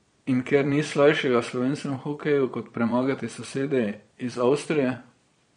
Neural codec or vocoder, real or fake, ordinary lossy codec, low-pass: vocoder, 22.05 kHz, 80 mel bands, Vocos; fake; MP3, 48 kbps; 9.9 kHz